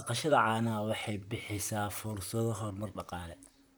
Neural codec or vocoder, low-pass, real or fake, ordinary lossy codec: vocoder, 44.1 kHz, 128 mel bands, Pupu-Vocoder; none; fake; none